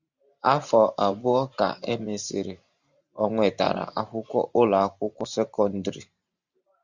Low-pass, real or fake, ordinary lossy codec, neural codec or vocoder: 7.2 kHz; fake; Opus, 64 kbps; vocoder, 44.1 kHz, 128 mel bands every 512 samples, BigVGAN v2